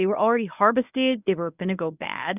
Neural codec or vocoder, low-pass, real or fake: codec, 24 kHz, 0.9 kbps, WavTokenizer, medium speech release version 1; 3.6 kHz; fake